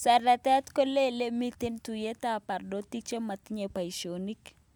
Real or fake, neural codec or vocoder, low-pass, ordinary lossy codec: real; none; none; none